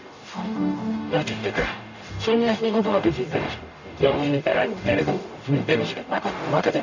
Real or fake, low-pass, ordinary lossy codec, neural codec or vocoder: fake; 7.2 kHz; none; codec, 44.1 kHz, 0.9 kbps, DAC